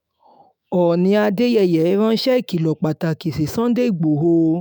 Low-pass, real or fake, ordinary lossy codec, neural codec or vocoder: none; fake; none; autoencoder, 48 kHz, 128 numbers a frame, DAC-VAE, trained on Japanese speech